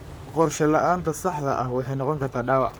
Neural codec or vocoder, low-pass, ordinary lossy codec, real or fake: codec, 44.1 kHz, 3.4 kbps, Pupu-Codec; none; none; fake